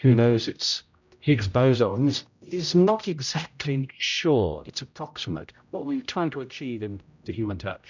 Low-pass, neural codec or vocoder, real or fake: 7.2 kHz; codec, 16 kHz, 0.5 kbps, X-Codec, HuBERT features, trained on general audio; fake